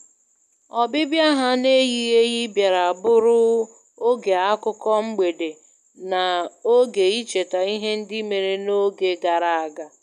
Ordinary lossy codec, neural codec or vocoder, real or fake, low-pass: none; none; real; 14.4 kHz